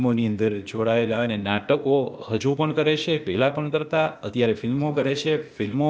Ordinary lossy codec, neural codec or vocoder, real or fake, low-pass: none; codec, 16 kHz, 0.8 kbps, ZipCodec; fake; none